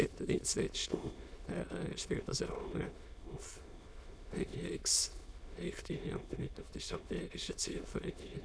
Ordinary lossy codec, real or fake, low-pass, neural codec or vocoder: none; fake; none; autoencoder, 22.05 kHz, a latent of 192 numbers a frame, VITS, trained on many speakers